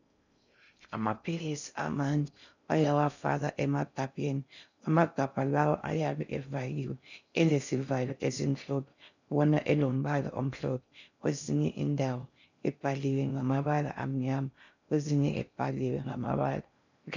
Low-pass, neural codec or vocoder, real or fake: 7.2 kHz; codec, 16 kHz in and 24 kHz out, 0.6 kbps, FocalCodec, streaming, 4096 codes; fake